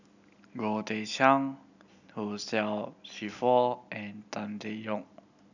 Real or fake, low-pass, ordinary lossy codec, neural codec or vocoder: real; 7.2 kHz; none; none